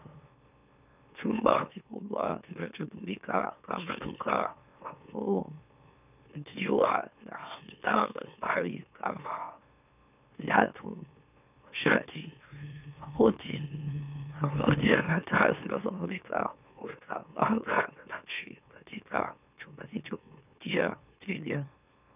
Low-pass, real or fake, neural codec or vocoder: 3.6 kHz; fake; autoencoder, 44.1 kHz, a latent of 192 numbers a frame, MeloTTS